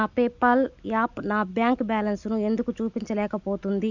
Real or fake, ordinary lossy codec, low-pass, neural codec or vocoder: real; none; 7.2 kHz; none